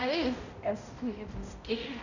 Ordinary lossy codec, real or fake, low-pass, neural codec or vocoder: none; fake; 7.2 kHz; codec, 16 kHz, 0.5 kbps, X-Codec, HuBERT features, trained on balanced general audio